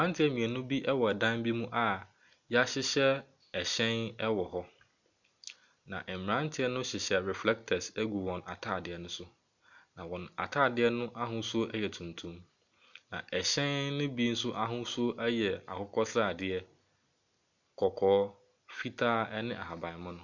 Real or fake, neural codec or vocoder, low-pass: real; none; 7.2 kHz